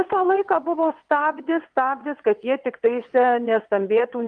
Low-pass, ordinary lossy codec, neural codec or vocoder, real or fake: 9.9 kHz; Opus, 32 kbps; vocoder, 22.05 kHz, 80 mel bands, WaveNeXt; fake